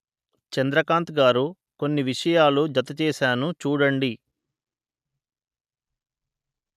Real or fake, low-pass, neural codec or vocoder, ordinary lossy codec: real; 14.4 kHz; none; none